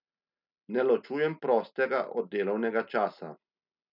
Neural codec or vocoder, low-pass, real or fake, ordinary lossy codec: none; 5.4 kHz; real; none